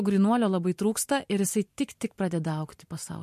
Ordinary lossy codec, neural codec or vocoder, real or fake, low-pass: MP3, 64 kbps; vocoder, 44.1 kHz, 128 mel bands every 512 samples, BigVGAN v2; fake; 14.4 kHz